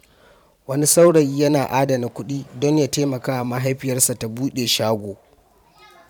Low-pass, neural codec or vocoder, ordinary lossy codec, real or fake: 19.8 kHz; vocoder, 44.1 kHz, 128 mel bands every 512 samples, BigVGAN v2; none; fake